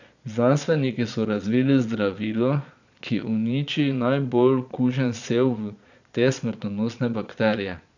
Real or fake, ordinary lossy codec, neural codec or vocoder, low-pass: fake; none; vocoder, 22.05 kHz, 80 mel bands, Vocos; 7.2 kHz